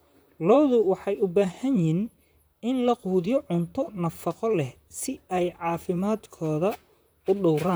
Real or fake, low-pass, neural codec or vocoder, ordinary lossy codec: fake; none; vocoder, 44.1 kHz, 128 mel bands, Pupu-Vocoder; none